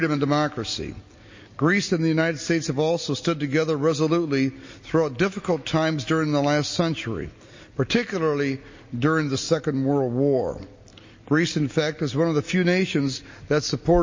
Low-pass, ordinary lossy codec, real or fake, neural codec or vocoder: 7.2 kHz; MP3, 32 kbps; real; none